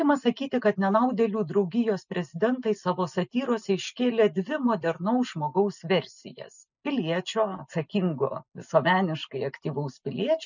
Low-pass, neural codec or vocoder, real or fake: 7.2 kHz; none; real